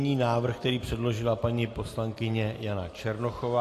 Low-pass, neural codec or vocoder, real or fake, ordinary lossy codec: 14.4 kHz; none; real; AAC, 48 kbps